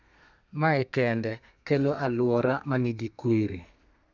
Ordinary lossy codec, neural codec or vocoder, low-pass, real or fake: none; codec, 32 kHz, 1.9 kbps, SNAC; 7.2 kHz; fake